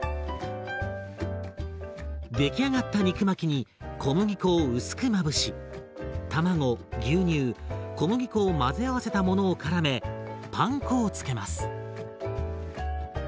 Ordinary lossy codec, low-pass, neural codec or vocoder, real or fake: none; none; none; real